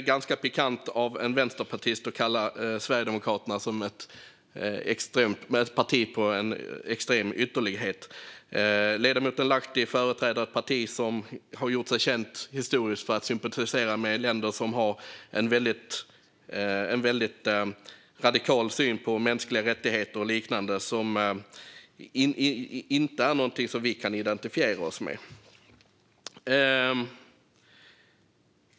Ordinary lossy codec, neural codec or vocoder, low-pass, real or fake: none; none; none; real